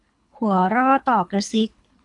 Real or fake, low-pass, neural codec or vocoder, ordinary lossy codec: fake; 10.8 kHz; codec, 24 kHz, 3 kbps, HILCodec; MP3, 96 kbps